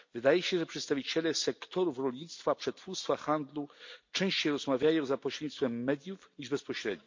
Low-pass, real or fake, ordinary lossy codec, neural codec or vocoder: 7.2 kHz; real; MP3, 48 kbps; none